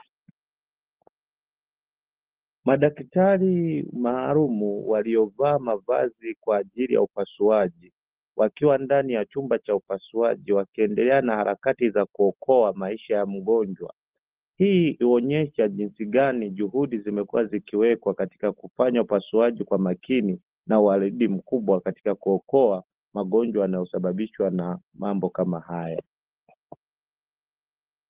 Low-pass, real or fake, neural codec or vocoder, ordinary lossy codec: 3.6 kHz; real; none; Opus, 16 kbps